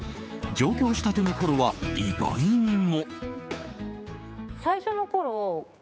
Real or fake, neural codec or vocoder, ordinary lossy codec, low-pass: fake; codec, 16 kHz, 4 kbps, X-Codec, HuBERT features, trained on general audio; none; none